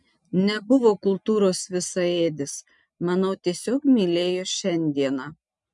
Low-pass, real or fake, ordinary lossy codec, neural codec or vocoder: 10.8 kHz; real; MP3, 96 kbps; none